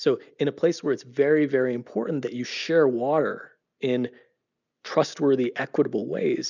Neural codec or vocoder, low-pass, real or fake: none; 7.2 kHz; real